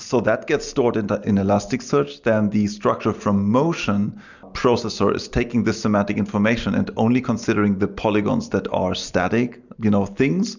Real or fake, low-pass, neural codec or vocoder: real; 7.2 kHz; none